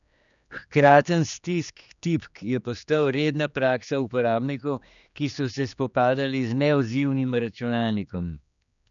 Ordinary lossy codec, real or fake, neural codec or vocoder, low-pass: none; fake; codec, 16 kHz, 2 kbps, X-Codec, HuBERT features, trained on general audio; 7.2 kHz